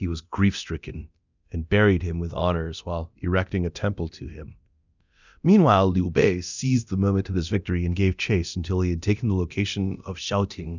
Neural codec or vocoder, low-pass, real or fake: codec, 24 kHz, 0.9 kbps, DualCodec; 7.2 kHz; fake